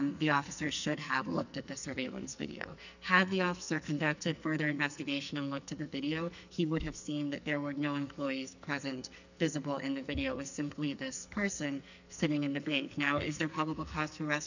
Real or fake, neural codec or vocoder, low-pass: fake; codec, 44.1 kHz, 2.6 kbps, SNAC; 7.2 kHz